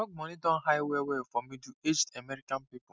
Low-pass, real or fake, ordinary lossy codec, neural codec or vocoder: none; real; none; none